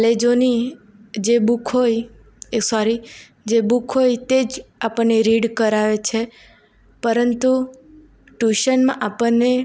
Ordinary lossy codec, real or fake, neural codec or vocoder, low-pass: none; real; none; none